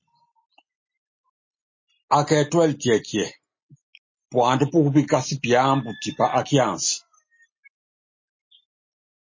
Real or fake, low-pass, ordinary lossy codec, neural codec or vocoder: real; 7.2 kHz; MP3, 32 kbps; none